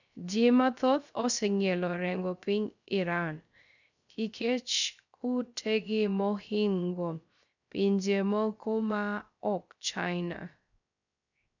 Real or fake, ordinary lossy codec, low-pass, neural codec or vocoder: fake; none; 7.2 kHz; codec, 16 kHz, 0.3 kbps, FocalCodec